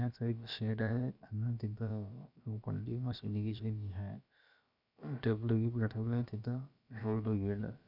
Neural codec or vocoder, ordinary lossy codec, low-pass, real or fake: codec, 16 kHz, about 1 kbps, DyCAST, with the encoder's durations; none; 5.4 kHz; fake